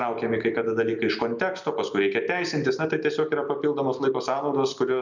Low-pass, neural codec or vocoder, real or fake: 7.2 kHz; none; real